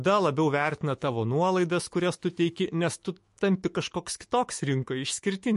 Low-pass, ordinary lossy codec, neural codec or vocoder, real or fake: 14.4 kHz; MP3, 48 kbps; autoencoder, 48 kHz, 128 numbers a frame, DAC-VAE, trained on Japanese speech; fake